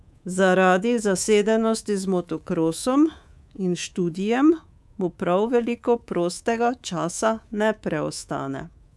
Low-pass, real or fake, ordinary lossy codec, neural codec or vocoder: none; fake; none; codec, 24 kHz, 3.1 kbps, DualCodec